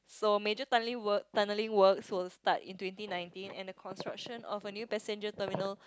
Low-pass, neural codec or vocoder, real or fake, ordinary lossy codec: none; none; real; none